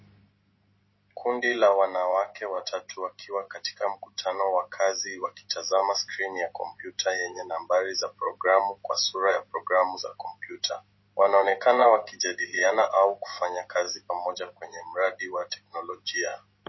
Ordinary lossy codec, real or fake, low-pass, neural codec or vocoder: MP3, 24 kbps; real; 7.2 kHz; none